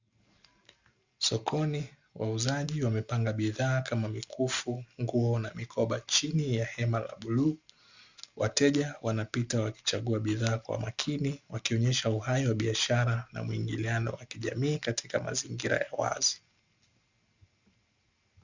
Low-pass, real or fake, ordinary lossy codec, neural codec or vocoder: 7.2 kHz; real; Opus, 64 kbps; none